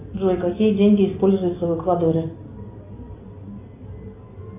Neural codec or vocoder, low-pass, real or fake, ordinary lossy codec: none; 3.6 kHz; real; AAC, 24 kbps